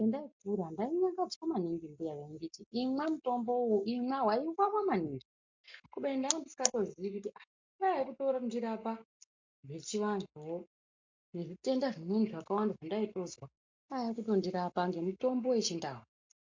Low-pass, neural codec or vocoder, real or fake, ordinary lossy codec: 7.2 kHz; none; real; MP3, 48 kbps